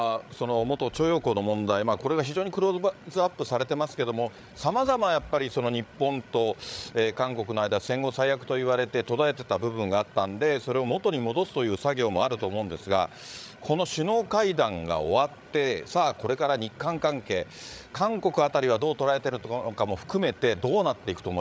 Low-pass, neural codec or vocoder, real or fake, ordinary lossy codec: none; codec, 16 kHz, 8 kbps, FreqCodec, larger model; fake; none